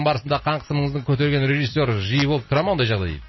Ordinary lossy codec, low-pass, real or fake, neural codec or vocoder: MP3, 24 kbps; 7.2 kHz; real; none